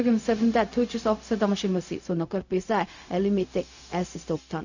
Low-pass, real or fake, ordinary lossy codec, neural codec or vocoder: 7.2 kHz; fake; none; codec, 16 kHz, 0.4 kbps, LongCat-Audio-Codec